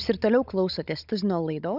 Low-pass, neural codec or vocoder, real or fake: 5.4 kHz; codec, 16 kHz, 16 kbps, FunCodec, trained on Chinese and English, 50 frames a second; fake